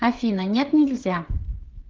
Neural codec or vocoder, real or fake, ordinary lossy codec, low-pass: codec, 16 kHz, 16 kbps, FreqCodec, smaller model; fake; Opus, 16 kbps; 7.2 kHz